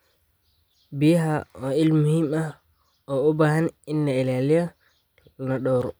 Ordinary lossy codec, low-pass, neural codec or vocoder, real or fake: none; none; none; real